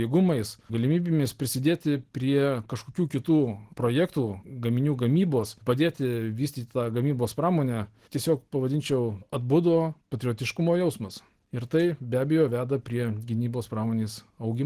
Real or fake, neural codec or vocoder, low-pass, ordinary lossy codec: real; none; 14.4 kHz; Opus, 16 kbps